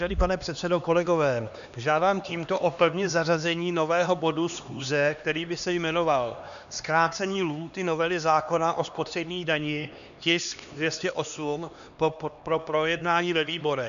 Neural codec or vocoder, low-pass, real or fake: codec, 16 kHz, 2 kbps, X-Codec, HuBERT features, trained on LibriSpeech; 7.2 kHz; fake